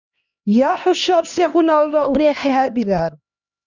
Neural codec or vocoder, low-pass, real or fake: codec, 16 kHz, 1 kbps, X-Codec, HuBERT features, trained on LibriSpeech; 7.2 kHz; fake